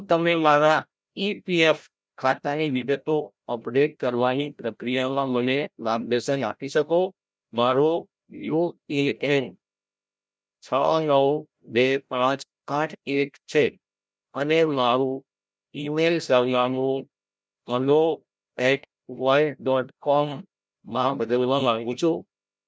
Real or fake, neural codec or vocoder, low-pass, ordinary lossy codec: fake; codec, 16 kHz, 0.5 kbps, FreqCodec, larger model; none; none